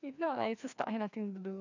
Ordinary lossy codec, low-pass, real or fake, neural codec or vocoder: none; 7.2 kHz; fake; codec, 16 kHz, 2 kbps, FreqCodec, larger model